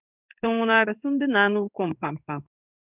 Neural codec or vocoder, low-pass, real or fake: codec, 16 kHz in and 24 kHz out, 1 kbps, XY-Tokenizer; 3.6 kHz; fake